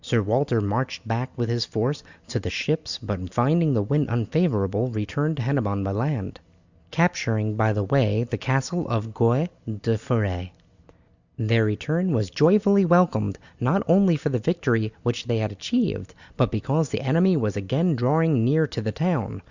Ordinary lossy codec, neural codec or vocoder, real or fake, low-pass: Opus, 64 kbps; none; real; 7.2 kHz